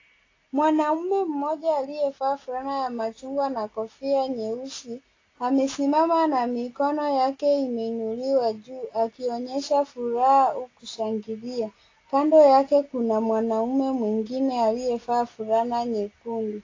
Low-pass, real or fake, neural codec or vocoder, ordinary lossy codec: 7.2 kHz; real; none; AAC, 32 kbps